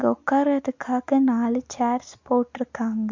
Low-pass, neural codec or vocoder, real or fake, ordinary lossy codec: 7.2 kHz; none; real; MP3, 48 kbps